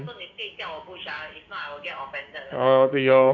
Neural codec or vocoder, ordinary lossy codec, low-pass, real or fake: codec, 16 kHz, 6 kbps, DAC; none; 7.2 kHz; fake